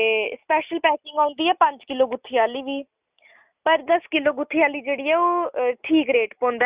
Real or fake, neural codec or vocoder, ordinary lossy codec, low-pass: real; none; Opus, 64 kbps; 3.6 kHz